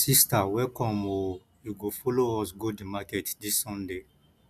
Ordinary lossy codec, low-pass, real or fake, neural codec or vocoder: none; 14.4 kHz; real; none